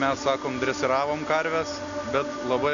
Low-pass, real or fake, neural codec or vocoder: 7.2 kHz; real; none